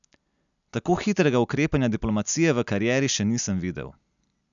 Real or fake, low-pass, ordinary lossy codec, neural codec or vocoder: real; 7.2 kHz; none; none